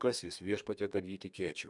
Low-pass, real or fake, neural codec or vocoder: 10.8 kHz; fake; codec, 32 kHz, 1.9 kbps, SNAC